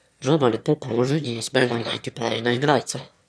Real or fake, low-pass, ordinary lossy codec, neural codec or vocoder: fake; none; none; autoencoder, 22.05 kHz, a latent of 192 numbers a frame, VITS, trained on one speaker